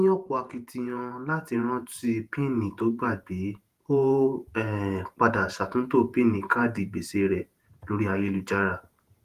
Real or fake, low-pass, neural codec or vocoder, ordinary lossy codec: fake; 14.4 kHz; vocoder, 48 kHz, 128 mel bands, Vocos; Opus, 24 kbps